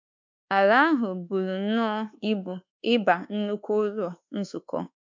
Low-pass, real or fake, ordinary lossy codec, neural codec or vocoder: 7.2 kHz; fake; MP3, 64 kbps; codec, 24 kHz, 1.2 kbps, DualCodec